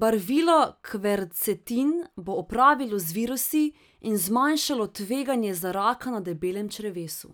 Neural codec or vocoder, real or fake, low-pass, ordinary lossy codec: none; real; none; none